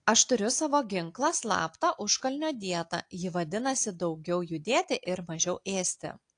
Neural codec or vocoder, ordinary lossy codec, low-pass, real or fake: none; AAC, 48 kbps; 9.9 kHz; real